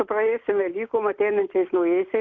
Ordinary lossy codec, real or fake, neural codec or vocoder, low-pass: Opus, 64 kbps; real; none; 7.2 kHz